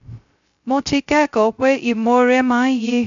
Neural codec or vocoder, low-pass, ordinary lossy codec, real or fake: codec, 16 kHz, 0.2 kbps, FocalCodec; 7.2 kHz; AAC, 64 kbps; fake